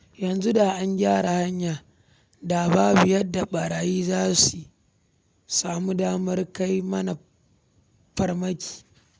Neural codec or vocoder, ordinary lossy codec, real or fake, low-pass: none; none; real; none